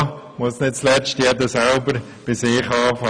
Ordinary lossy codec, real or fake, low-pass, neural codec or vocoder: none; real; 9.9 kHz; none